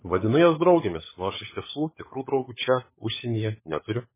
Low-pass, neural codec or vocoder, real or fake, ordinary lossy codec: 3.6 kHz; codec, 16 kHz, 16 kbps, FunCodec, trained on LibriTTS, 50 frames a second; fake; MP3, 16 kbps